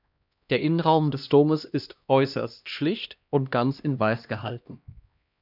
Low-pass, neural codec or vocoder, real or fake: 5.4 kHz; codec, 16 kHz, 1 kbps, X-Codec, HuBERT features, trained on LibriSpeech; fake